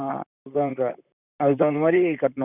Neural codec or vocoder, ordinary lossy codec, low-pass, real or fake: vocoder, 44.1 kHz, 128 mel bands, Pupu-Vocoder; none; 3.6 kHz; fake